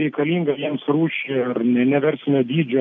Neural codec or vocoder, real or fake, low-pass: none; real; 7.2 kHz